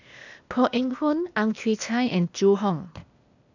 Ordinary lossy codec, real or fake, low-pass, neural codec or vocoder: none; fake; 7.2 kHz; codec, 16 kHz, 0.8 kbps, ZipCodec